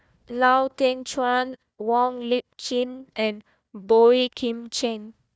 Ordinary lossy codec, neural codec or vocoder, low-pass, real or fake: none; codec, 16 kHz, 1 kbps, FunCodec, trained on Chinese and English, 50 frames a second; none; fake